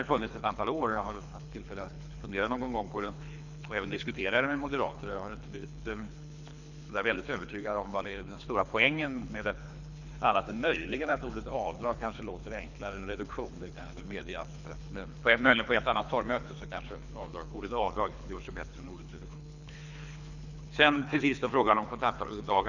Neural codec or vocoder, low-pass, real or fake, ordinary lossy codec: codec, 24 kHz, 3 kbps, HILCodec; 7.2 kHz; fake; none